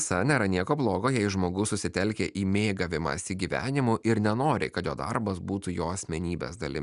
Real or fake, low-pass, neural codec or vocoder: real; 10.8 kHz; none